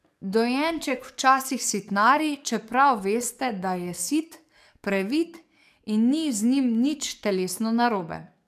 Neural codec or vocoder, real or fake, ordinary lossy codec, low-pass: codec, 44.1 kHz, 7.8 kbps, DAC; fake; AAC, 96 kbps; 14.4 kHz